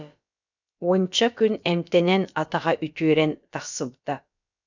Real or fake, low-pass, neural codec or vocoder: fake; 7.2 kHz; codec, 16 kHz, about 1 kbps, DyCAST, with the encoder's durations